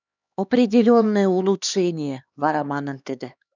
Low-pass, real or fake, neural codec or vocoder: 7.2 kHz; fake; codec, 16 kHz, 2 kbps, X-Codec, HuBERT features, trained on LibriSpeech